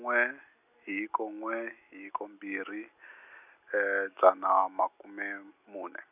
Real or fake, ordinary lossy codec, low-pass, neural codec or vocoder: real; none; 3.6 kHz; none